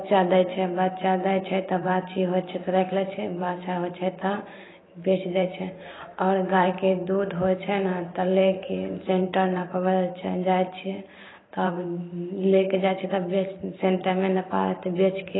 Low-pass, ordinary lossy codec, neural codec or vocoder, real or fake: 7.2 kHz; AAC, 16 kbps; vocoder, 44.1 kHz, 128 mel bands every 512 samples, BigVGAN v2; fake